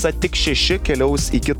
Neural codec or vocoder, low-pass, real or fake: none; 19.8 kHz; real